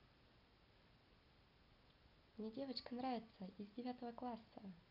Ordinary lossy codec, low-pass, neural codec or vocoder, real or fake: none; 5.4 kHz; none; real